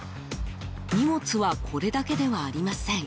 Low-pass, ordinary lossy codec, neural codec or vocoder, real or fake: none; none; none; real